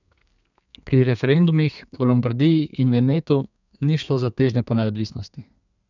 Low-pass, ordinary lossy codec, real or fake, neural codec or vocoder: 7.2 kHz; none; fake; codec, 32 kHz, 1.9 kbps, SNAC